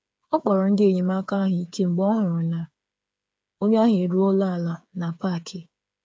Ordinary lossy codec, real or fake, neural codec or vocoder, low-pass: none; fake; codec, 16 kHz, 8 kbps, FreqCodec, smaller model; none